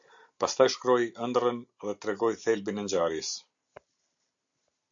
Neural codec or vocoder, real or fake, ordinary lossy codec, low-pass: none; real; MP3, 64 kbps; 7.2 kHz